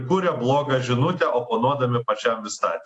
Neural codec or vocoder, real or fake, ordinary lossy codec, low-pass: none; real; AAC, 48 kbps; 10.8 kHz